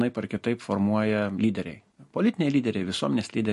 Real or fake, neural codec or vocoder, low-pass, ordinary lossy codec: real; none; 14.4 kHz; MP3, 48 kbps